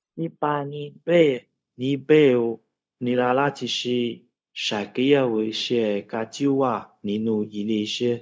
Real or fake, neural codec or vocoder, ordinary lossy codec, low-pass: fake; codec, 16 kHz, 0.4 kbps, LongCat-Audio-Codec; none; none